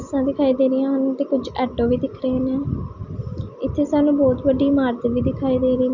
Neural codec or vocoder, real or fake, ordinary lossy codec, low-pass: none; real; none; 7.2 kHz